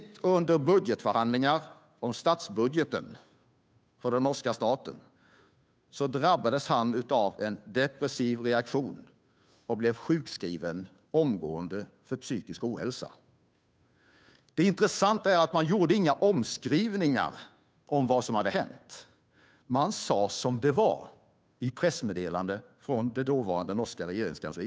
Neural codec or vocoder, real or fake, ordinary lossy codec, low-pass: codec, 16 kHz, 2 kbps, FunCodec, trained on Chinese and English, 25 frames a second; fake; none; none